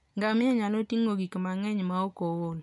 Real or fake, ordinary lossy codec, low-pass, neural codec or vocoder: real; none; 10.8 kHz; none